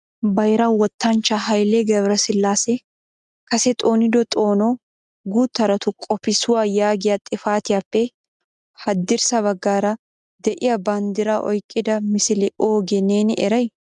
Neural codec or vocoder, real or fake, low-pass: none; real; 10.8 kHz